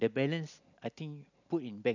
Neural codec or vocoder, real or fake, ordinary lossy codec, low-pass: none; real; none; 7.2 kHz